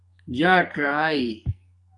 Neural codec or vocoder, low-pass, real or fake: codec, 44.1 kHz, 2.6 kbps, SNAC; 10.8 kHz; fake